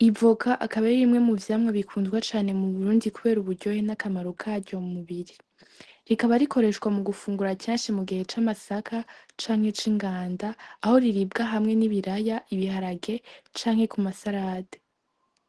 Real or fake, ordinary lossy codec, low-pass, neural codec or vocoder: real; Opus, 16 kbps; 10.8 kHz; none